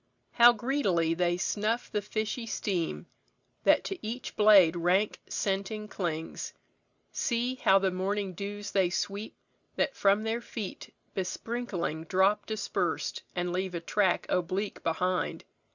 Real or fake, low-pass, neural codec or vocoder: real; 7.2 kHz; none